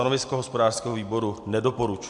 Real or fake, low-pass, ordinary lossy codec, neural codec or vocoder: real; 10.8 kHz; MP3, 64 kbps; none